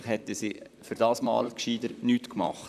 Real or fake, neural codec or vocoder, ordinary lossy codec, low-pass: fake; vocoder, 44.1 kHz, 128 mel bands, Pupu-Vocoder; none; 14.4 kHz